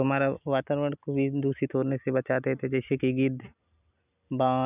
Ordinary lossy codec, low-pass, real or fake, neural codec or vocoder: none; 3.6 kHz; real; none